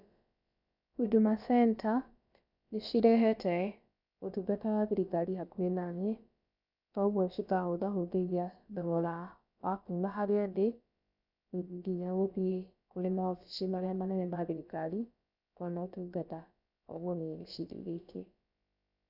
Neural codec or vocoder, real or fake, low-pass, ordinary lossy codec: codec, 16 kHz, about 1 kbps, DyCAST, with the encoder's durations; fake; 5.4 kHz; none